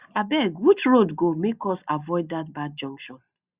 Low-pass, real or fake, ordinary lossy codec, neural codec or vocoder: 3.6 kHz; real; Opus, 64 kbps; none